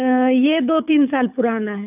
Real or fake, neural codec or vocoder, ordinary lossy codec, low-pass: fake; codec, 24 kHz, 6 kbps, HILCodec; none; 3.6 kHz